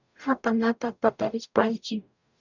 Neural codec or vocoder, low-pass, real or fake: codec, 44.1 kHz, 0.9 kbps, DAC; 7.2 kHz; fake